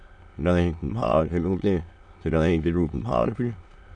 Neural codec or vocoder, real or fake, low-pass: autoencoder, 22.05 kHz, a latent of 192 numbers a frame, VITS, trained on many speakers; fake; 9.9 kHz